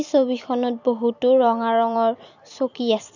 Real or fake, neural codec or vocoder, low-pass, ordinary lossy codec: fake; vocoder, 44.1 kHz, 128 mel bands every 256 samples, BigVGAN v2; 7.2 kHz; none